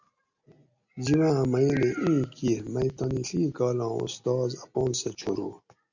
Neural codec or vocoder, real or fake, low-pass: none; real; 7.2 kHz